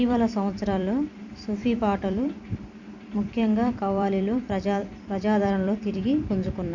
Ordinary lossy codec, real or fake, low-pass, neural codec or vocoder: none; fake; 7.2 kHz; vocoder, 44.1 kHz, 128 mel bands every 256 samples, BigVGAN v2